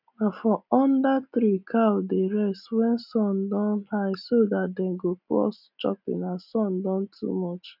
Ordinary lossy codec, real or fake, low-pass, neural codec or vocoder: none; real; 5.4 kHz; none